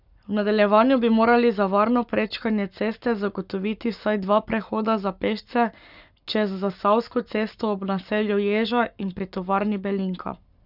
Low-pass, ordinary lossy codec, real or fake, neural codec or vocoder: 5.4 kHz; none; fake; codec, 44.1 kHz, 7.8 kbps, Pupu-Codec